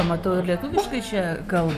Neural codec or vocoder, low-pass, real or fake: none; 14.4 kHz; real